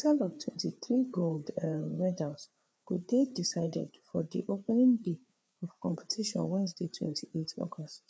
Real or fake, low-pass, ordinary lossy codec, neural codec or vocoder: fake; none; none; codec, 16 kHz, 4 kbps, FreqCodec, larger model